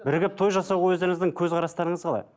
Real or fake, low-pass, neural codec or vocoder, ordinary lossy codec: real; none; none; none